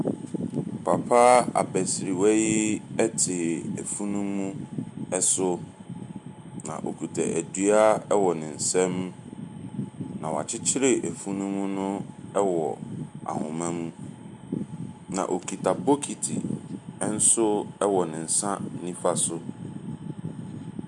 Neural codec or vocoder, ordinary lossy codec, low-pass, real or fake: none; MP3, 64 kbps; 10.8 kHz; real